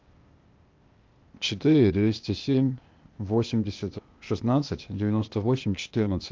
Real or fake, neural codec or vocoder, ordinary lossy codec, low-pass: fake; codec, 16 kHz, 0.8 kbps, ZipCodec; Opus, 24 kbps; 7.2 kHz